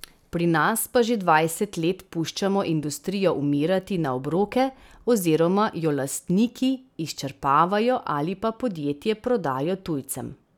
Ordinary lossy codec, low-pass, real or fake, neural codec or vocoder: none; 19.8 kHz; real; none